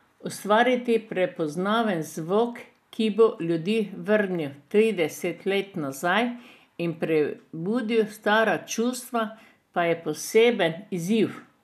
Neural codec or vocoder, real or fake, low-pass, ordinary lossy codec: none; real; 14.4 kHz; none